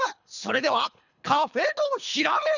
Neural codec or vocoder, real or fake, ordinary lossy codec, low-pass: codec, 24 kHz, 3 kbps, HILCodec; fake; none; 7.2 kHz